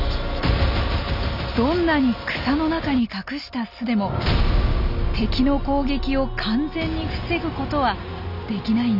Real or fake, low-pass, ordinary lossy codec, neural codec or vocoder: real; 5.4 kHz; none; none